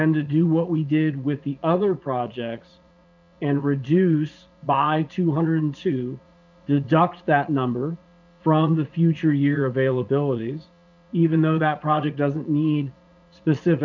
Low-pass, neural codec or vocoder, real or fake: 7.2 kHz; vocoder, 22.05 kHz, 80 mel bands, Vocos; fake